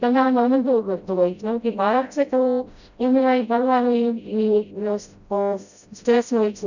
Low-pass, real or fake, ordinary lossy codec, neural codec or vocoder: 7.2 kHz; fake; none; codec, 16 kHz, 0.5 kbps, FreqCodec, smaller model